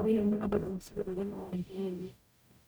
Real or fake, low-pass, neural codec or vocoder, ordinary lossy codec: fake; none; codec, 44.1 kHz, 0.9 kbps, DAC; none